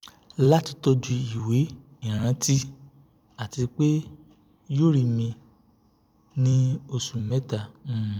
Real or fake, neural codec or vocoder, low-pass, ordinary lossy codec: fake; vocoder, 44.1 kHz, 128 mel bands every 256 samples, BigVGAN v2; 19.8 kHz; none